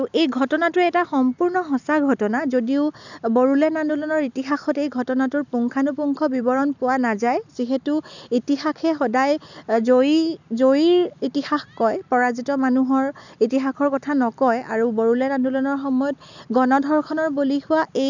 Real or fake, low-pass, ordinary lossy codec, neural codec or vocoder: real; 7.2 kHz; none; none